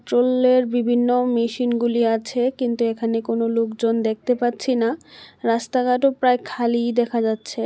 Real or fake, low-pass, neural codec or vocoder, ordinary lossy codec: real; none; none; none